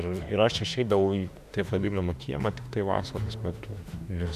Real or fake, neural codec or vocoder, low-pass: fake; autoencoder, 48 kHz, 32 numbers a frame, DAC-VAE, trained on Japanese speech; 14.4 kHz